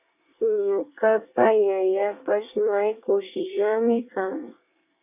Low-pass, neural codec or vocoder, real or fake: 3.6 kHz; codec, 24 kHz, 1 kbps, SNAC; fake